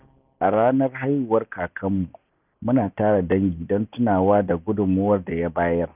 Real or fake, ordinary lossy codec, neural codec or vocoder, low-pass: real; none; none; 3.6 kHz